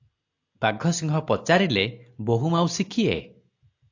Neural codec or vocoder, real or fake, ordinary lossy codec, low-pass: none; real; AAC, 48 kbps; 7.2 kHz